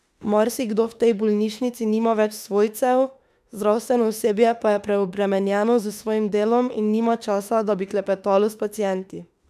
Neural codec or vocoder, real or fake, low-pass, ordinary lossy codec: autoencoder, 48 kHz, 32 numbers a frame, DAC-VAE, trained on Japanese speech; fake; 14.4 kHz; none